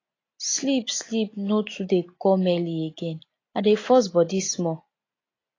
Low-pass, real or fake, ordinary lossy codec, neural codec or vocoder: 7.2 kHz; real; AAC, 32 kbps; none